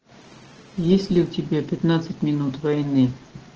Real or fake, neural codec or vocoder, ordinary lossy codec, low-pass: real; none; Opus, 16 kbps; 7.2 kHz